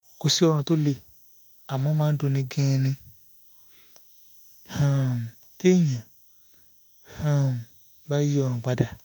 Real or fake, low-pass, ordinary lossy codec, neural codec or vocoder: fake; none; none; autoencoder, 48 kHz, 32 numbers a frame, DAC-VAE, trained on Japanese speech